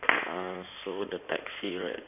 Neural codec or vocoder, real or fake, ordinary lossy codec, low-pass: codec, 16 kHz in and 24 kHz out, 2.2 kbps, FireRedTTS-2 codec; fake; none; 3.6 kHz